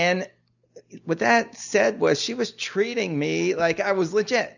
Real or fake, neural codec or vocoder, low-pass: real; none; 7.2 kHz